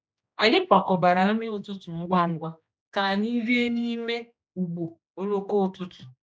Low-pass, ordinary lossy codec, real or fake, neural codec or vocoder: none; none; fake; codec, 16 kHz, 1 kbps, X-Codec, HuBERT features, trained on general audio